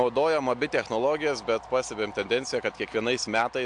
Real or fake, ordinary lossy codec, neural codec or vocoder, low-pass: real; AAC, 64 kbps; none; 9.9 kHz